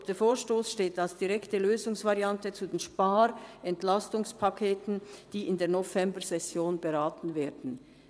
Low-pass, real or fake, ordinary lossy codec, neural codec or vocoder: none; fake; none; vocoder, 22.05 kHz, 80 mel bands, WaveNeXt